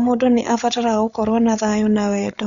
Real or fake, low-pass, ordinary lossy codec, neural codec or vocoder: fake; 7.2 kHz; none; codec, 16 kHz, 8 kbps, FreqCodec, larger model